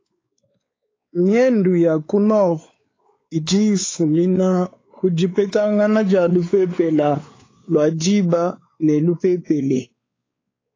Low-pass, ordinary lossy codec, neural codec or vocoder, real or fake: 7.2 kHz; AAC, 32 kbps; codec, 16 kHz, 4 kbps, X-Codec, WavLM features, trained on Multilingual LibriSpeech; fake